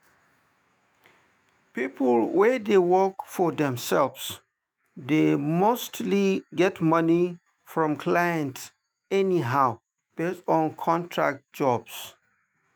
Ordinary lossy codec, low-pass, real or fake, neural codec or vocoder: none; none; fake; autoencoder, 48 kHz, 128 numbers a frame, DAC-VAE, trained on Japanese speech